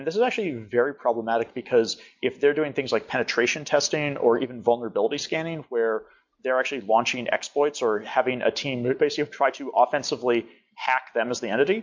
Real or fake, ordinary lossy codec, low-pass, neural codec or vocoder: real; MP3, 48 kbps; 7.2 kHz; none